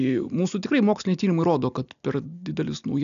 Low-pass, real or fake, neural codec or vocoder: 7.2 kHz; real; none